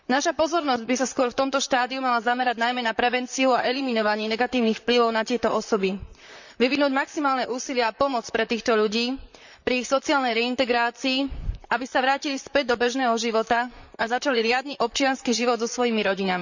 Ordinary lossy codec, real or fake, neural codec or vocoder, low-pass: none; fake; vocoder, 44.1 kHz, 128 mel bands, Pupu-Vocoder; 7.2 kHz